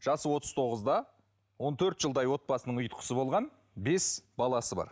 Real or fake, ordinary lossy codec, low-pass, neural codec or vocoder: real; none; none; none